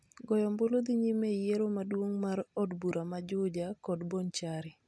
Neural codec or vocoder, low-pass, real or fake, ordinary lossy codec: none; none; real; none